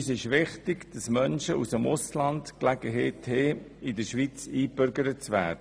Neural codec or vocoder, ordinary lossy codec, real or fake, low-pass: none; none; real; none